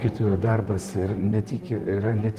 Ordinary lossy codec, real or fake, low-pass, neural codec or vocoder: Opus, 24 kbps; fake; 14.4 kHz; vocoder, 44.1 kHz, 128 mel bands, Pupu-Vocoder